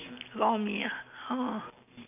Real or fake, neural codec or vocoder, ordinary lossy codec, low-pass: real; none; none; 3.6 kHz